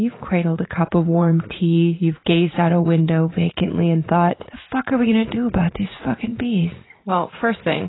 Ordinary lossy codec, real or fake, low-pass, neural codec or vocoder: AAC, 16 kbps; fake; 7.2 kHz; vocoder, 44.1 kHz, 80 mel bands, Vocos